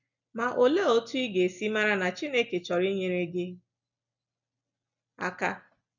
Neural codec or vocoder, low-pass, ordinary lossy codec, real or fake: none; 7.2 kHz; none; real